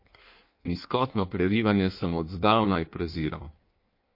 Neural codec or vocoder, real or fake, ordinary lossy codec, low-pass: codec, 16 kHz in and 24 kHz out, 1.1 kbps, FireRedTTS-2 codec; fake; MP3, 32 kbps; 5.4 kHz